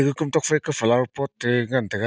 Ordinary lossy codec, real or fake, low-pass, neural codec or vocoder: none; real; none; none